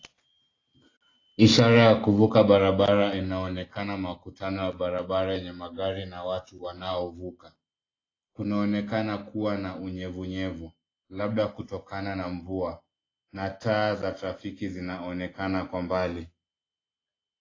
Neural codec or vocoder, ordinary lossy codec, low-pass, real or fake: none; AAC, 32 kbps; 7.2 kHz; real